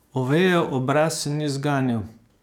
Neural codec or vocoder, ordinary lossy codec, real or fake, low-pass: codec, 44.1 kHz, 7.8 kbps, DAC; none; fake; 19.8 kHz